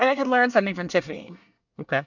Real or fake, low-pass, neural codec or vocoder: fake; 7.2 kHz; codec, 24 kHz, 1 kbps, SNAC